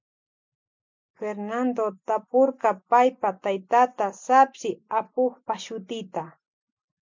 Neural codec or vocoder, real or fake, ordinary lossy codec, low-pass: none; real; MP3, 64 kbps; 7.2 kHz